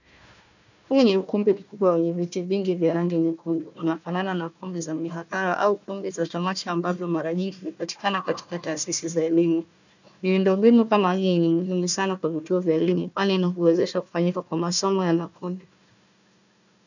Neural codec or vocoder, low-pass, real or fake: codec, 16 kHz, 1 kbps, FunCodec, trained on Chinese and English, 50 frames a second; 7.2 kHz; fake